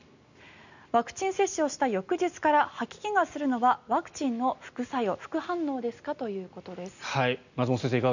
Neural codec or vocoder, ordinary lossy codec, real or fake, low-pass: none; none; real; 7.2 kHz